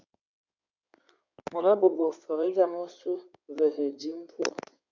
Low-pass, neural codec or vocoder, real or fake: 7.2 kHz; codec, 16 kHz in and 24 kHz out, 2.2 kbps, FireRedTTS-2 codec; fake